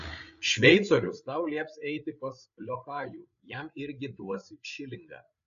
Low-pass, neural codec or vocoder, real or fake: 7.2 kHz; codec, 16 kHz, 8 kbps, FreqCodec, larger model; fake